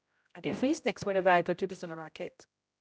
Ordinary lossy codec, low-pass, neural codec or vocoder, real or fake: none; none; codec, 16 kHz, 0.5 kbps, X-Codec, HuBERT features, trained on general audio; fake